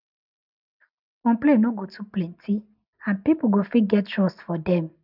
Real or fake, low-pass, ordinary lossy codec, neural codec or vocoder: real; 5.4 kHz; none; none